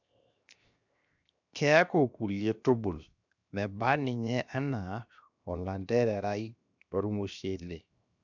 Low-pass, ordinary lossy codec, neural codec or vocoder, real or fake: 7.2 kHz; none; codec, 16 kHz, 0.7 kbps, FocalCodec; fake